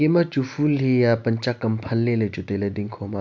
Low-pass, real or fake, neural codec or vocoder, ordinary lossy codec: none; real; none; none